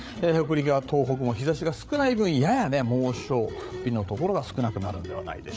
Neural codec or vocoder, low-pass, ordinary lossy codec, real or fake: codec, 16 kHz, 8 kbps, FreqCodec, larger model; none; none; fake